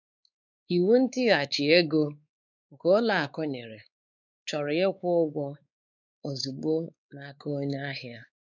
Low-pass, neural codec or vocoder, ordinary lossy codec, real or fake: 7.2 kHz; codec, 16 kHz, 4 kbps, X-Codec, WavLM features, trained on Multilingual LibriSpeech; none; fake